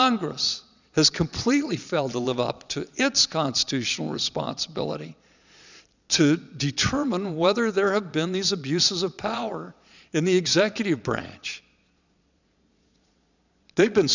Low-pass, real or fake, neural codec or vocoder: 7.2 kHz; fake; vocoder, 22.05 kHz, 80 mel bands, Vocos